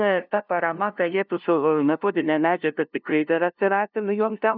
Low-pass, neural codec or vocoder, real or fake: 5.4 kHz; codec, 16 kHz, 0.5 kbps, FunCodec, trained on LibriTTS, 25 frames a second; fake